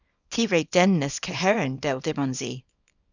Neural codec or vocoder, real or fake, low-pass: codec, 24 kHz, 0.9 kbps, WavTokenizer, small release; fake; 7.2 kHz